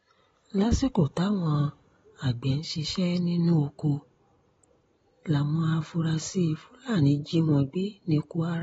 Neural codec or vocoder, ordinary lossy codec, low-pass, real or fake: none; AAC, 24 kbps; 19.8 kHz; real